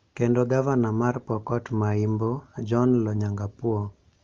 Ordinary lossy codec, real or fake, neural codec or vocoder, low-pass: Opus, 32 kbps; real; none; 7.2 kHz